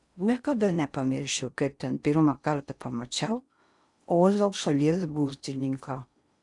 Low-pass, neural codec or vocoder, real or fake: 10.8 kHz; codec, 16 kHz in and 24 kHz out, 0.6 kbps, FocalCodec, streaming, 4096 codes; fake